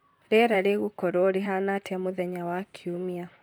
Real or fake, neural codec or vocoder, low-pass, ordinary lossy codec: fake; vocoder, 44.1 kHz, 128 mel bands, Pupu-Vocoder; none; none